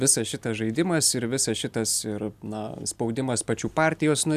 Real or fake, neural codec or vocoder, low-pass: real; none; 14.4 kHz